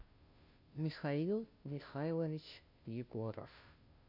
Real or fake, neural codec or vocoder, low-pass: fake; codec, 16 kHz, 0.5 kbps, FunCodec, trained on Chinese and English, 25 frames a second; 5.4 kHz